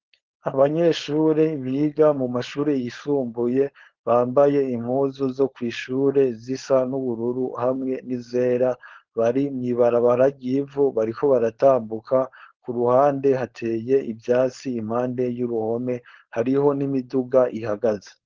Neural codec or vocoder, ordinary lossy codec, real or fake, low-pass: codec, 16 kHz, 4.8 kbps, FACodec; Opus, 16 kbps; fake; 7.2 kHz